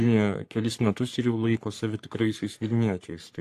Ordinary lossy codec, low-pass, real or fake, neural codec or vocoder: AAC, 64 kbps; 14.4 kHz; fake; codec, 44.1 kHz, 3.4 kbps, Pupu-Codec